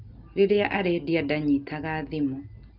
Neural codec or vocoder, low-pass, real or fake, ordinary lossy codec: none; 5.4 kHz; real; Opus, 24 kbps